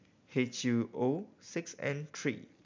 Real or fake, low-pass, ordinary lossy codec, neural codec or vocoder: real; 7.2 kHz; MP3, 64 kbps; none